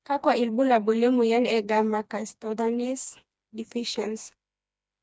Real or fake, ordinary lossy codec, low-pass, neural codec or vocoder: fake; none; none; codec, 16 kHz, 2 kbps, FreqCodec, smaller model